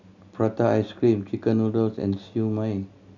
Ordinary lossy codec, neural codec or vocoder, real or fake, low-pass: none; none; real; 7.2 kHz